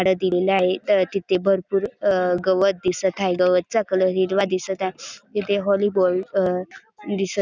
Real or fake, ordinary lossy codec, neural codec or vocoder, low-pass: real; none; none; none